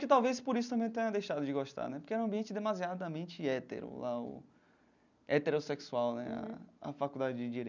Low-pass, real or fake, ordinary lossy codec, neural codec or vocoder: 7.2 kHz; real; none; none